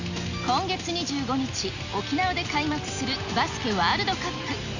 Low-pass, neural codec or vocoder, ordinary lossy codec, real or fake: 7.2 kHz; none; none; real